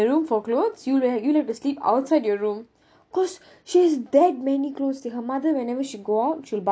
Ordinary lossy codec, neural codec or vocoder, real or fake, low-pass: none; none; real; none